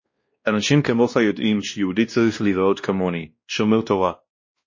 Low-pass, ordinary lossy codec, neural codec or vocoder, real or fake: 7.2 kHz; MP3, 32 kbps; codec, 16 kHz, 1 kbps, X-Codec, WavLM features, trained on Multilingual LibriSpeech; fake